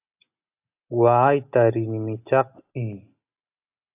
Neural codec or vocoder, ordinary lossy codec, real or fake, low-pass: none; AAC, 24 kbps; real; 3.6 kHz